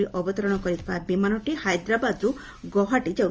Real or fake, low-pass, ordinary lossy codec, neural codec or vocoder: real; 7.2 kHz; Opus, 24 kbps; none